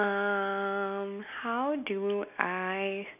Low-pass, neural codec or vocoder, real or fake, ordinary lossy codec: 3.6 kHz; none; real; none